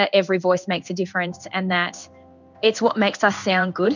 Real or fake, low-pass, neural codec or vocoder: fake; 7.2 kHz; codec, 16 kHz in and 24 kHz out, 1 kbps, XY-Tokenizer